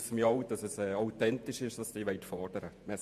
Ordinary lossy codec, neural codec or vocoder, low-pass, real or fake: MP3, 96 kbps; vocoder, 48 kHz, 128 mel bands, Vocos; 14.4 kHz; fake